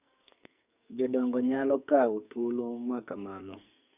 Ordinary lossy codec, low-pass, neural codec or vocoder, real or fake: none; 3.6 kHz; codec, 44.1 kHz, 2.6 kbps, SNAC; fake